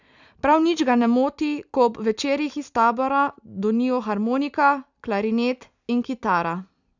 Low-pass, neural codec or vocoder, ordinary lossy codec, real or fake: 7.2 kHz; none; none; real